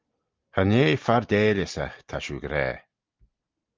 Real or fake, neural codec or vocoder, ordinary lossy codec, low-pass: real; none; Opus, 24 kbps; 7.2 kHz